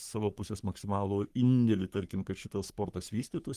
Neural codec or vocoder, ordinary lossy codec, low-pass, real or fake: codec, 44.1 kHz, 3.4 kbps, Pupu-Codec; Opus, 24 kbps; 14.4 kHz; fake